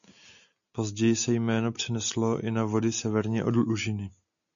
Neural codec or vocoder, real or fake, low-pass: none; real; 7.2 kHz